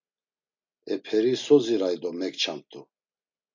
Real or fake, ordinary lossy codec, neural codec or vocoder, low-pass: real; MP3, 64 kbps; none; 7.2 kHz